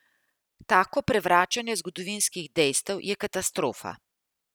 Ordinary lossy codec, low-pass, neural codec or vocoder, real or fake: none; none; none; real